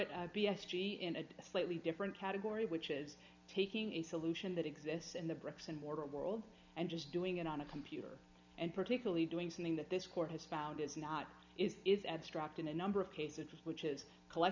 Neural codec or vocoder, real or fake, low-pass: none; real; 7.2 kHz